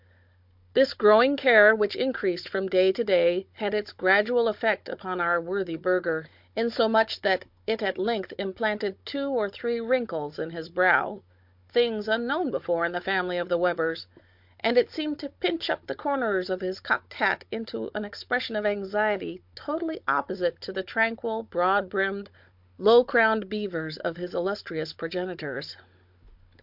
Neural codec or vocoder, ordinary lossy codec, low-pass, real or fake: codec, 16 kHz, 16 kbps, FunCodec, trained on Chinese and English, 50 frames a second; MP3, 48 kbps; 5.4 kHz; fake